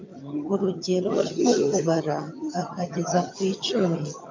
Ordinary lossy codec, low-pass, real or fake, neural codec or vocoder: MP3, 48 kbps; 7.2 kHz; fake; vocoder, 22.05 kHz, 80 mel bands, HiFi-GAN